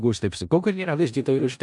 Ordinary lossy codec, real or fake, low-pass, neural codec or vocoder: MP3, 96 kbps; fake; 10.8 kHz; codec, 16 kHz in and 24 kHz out, 0.4 kbps, LongCat-Audio-Codec, four codebook decoder